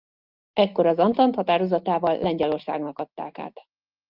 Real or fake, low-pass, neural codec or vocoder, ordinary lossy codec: real; 5.4 kHz; none; Opus, 32 kbps